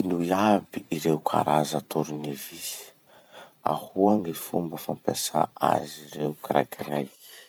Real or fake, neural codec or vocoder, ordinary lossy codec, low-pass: fake; vocoder, 44.1 kHz, 128 mel bands every 512 samples, BigVGAN v2; none; none